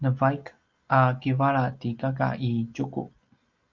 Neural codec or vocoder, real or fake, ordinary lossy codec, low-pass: none; real; Opus, 24 kbps; 7.2 kHz